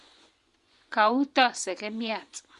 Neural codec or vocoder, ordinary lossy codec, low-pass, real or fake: vocoder, 24 kHz, 100 mel bands, Vocos; Opus, 64 kbps; 10.8 kHz; fake